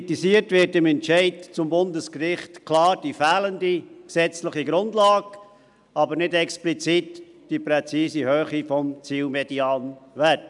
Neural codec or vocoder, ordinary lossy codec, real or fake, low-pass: none; none; real; 10.8 kHz